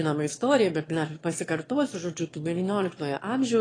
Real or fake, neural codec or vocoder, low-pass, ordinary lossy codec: fake; autoencoder, 22.05 kHz, a latent of 192 numbers a frame, VITS, trained on one speaker; 9.9 kHz; AAC, 32 kbps